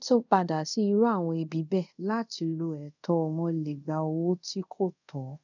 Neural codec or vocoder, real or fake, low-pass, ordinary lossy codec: codec, 24 kHz, 0.5 kbps, DualCodec; fake; 7.2 kHz; none